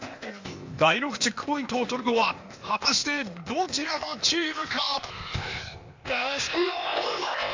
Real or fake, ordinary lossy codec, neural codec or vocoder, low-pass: fake; MP3, 48 kbps; codec, 16 kHz, 0.8 kbps, ZipCodec; 7.2 kHz